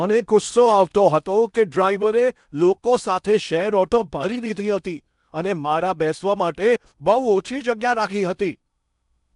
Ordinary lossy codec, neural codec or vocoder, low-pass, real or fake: none; codec, 16 kHz in and 24 kHz out, 0.8 kbps, FocalCodec, streaming, 65536 codes; 10.8 kHz; fake